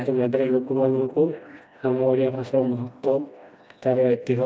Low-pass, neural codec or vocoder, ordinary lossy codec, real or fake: none; codec, 16 kHz, 1 kbps, FreqCodec, smaller model; none; fake